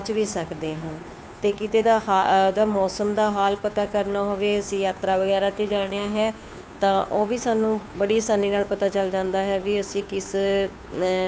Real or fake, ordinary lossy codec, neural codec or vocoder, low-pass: fake; none; codec, 16 kHz, 2 kbps, FunCodec, trained on Chinese and English, 25 frames a second; none